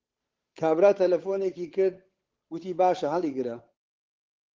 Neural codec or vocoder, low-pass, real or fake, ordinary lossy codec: codec, 16 kHz, 8 kbps, FunCodec, trained on Chinese and English, 25 frames a second; 7.2 kHz; fake; Opus, 16 kbps